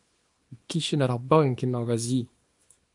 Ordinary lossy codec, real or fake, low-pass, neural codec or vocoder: MP3, 48 kbps; fake; 10.8 kHz; codec, 24 kHz, 0.9 kbps, WavTokenizer, small release